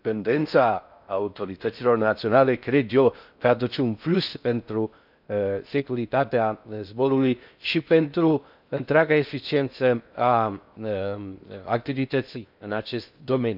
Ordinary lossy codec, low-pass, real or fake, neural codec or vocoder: none; 5.4 kHz; fake; codec, 16 kHz in and 24 kHz out, 0.6 kbps, FocalCodec, streaming, 4096 codes